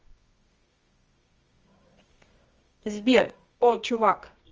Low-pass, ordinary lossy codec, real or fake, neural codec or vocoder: 7.2 kHz; Opus, 24 kbps; fake; codec, 24 kHz, 0.9 kbps, WavTokenizer, medium music audio release